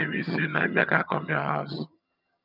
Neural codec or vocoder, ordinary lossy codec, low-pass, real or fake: vocoder, 22.05 kHz, 80 mel bands, HiFi-GAN; none; 5.4 kHz; fake